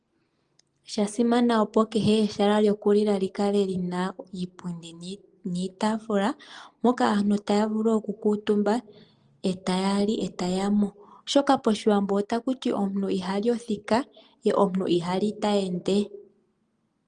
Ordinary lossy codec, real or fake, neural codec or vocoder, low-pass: Opus, 24 kbps; real; none; 9.9 kHz